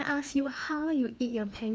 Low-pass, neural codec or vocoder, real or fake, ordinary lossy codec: none; codec, 16 kHz, 2 kbps, FreqCodec, larger model; fake; none